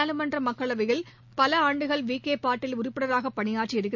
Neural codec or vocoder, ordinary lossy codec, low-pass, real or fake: none; none; 7.2 kHz; real